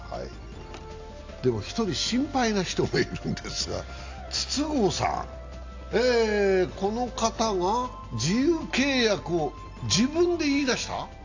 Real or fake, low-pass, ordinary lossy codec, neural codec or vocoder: real; 7.2 kHz; AAC, 48 kbps; none